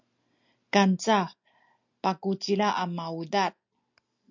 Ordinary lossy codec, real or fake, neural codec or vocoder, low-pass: MP3, 64 kbps; real; none; 7.2 kHz